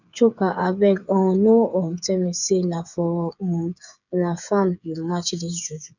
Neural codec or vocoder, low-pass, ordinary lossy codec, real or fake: codec, 16 kHz, 8 kbps, FreqCodec, smaller model; 7.2 kHz; none; fake